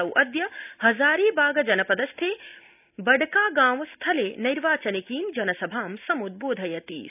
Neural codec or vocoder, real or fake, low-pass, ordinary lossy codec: none; real; 3.6 kHz; none